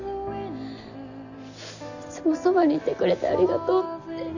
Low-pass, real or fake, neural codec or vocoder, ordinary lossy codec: 7.2 kHz; real; none; none